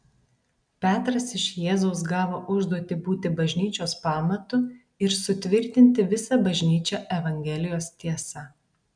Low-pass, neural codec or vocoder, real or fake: 9.9 kHz; none; real